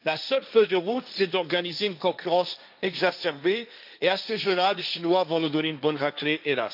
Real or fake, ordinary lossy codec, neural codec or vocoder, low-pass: fake; AAC, 48 kbps; codec, 16 kHz, 1.1 kbps, Voila-Tokenizer; 5.4 kHz